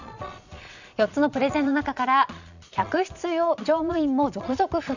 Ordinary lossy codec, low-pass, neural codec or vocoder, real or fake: none; 7.2 kHz; vocoder, 22.05 kHz, 80 mel bands, Vocos; fake